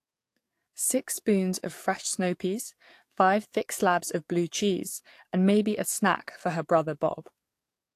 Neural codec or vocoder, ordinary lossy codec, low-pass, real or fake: codec, 44.1 kHz, 7.8 kbps, DAC; AAC, 64 kbps; 14.4 kHz; fake